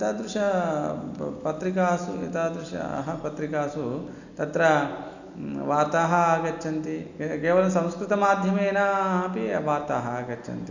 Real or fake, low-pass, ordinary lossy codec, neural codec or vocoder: real; 7.2 kHz; none; none